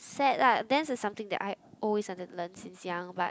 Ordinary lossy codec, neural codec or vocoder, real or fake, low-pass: none; none; real; none